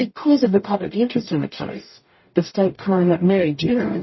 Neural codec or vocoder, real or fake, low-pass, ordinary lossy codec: codec, 44.1 kHz, 0.9 kbps, DAC; fake; 7.2 kHz; MP3, 24 kbps